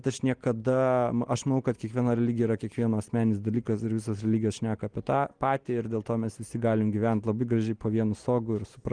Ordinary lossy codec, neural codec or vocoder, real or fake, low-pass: Opus, 24 kbps; none; real; 9.9 kHz